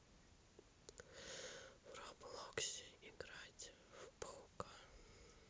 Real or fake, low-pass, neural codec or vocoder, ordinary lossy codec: real; none; none; none